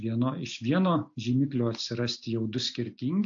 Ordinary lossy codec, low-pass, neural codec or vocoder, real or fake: AAC, 48 kbps; 7.2 kHz; none; real